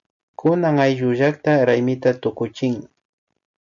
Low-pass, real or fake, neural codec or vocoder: 7.2 kHz; real; none